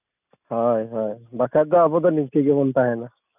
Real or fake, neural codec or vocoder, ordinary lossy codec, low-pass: real; none; none; 3.6 kHz